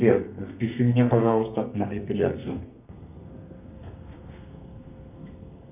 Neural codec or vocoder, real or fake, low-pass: codec, 32 kHz, 1.9 kbps, SNAC; fake; 3.6 kHz